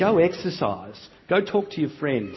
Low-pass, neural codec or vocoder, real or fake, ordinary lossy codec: 7.2 kHz; none; real; MP3, 24 kbps